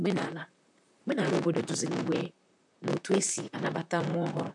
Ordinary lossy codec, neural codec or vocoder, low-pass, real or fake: none; vocoder, 44.1 kHz, 128 mel bands, Pupu-Vocoder; 10.8 kHz; fake